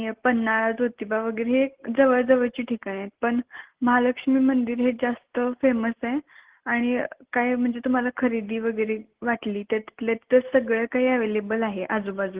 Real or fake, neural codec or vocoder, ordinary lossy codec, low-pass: real; none; Opus, 16 kbps; 3.6 kHz